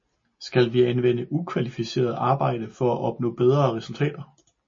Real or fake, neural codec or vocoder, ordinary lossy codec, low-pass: real; none; MP3, 32 kbps; 7.2 kHz